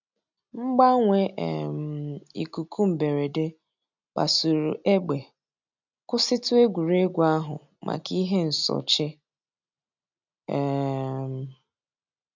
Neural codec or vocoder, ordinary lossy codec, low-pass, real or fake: none; none; 7.2 kHz; real